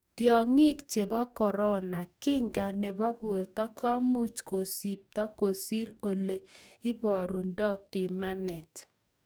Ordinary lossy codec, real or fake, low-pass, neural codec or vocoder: none; fake; none; codec, 44.1 kHz, 2.6 kbps, DAC